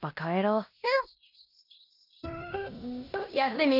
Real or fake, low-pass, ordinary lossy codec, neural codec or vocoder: fake; 5.4 kHz; none; codec, 16 kHz in and 24 kHz out, 0.9 kbps, LongCat-Audio-Codec, fine tuned four codebook decoder